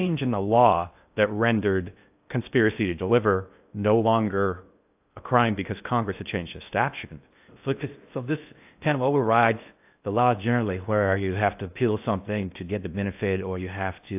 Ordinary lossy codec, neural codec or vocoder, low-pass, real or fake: AAC, 32 kbps; codec, 16 kHz in and 24 kHz out, 0.6 kbps, FocalCodec, streaming, 2048 codes; 3.6 kHz; fake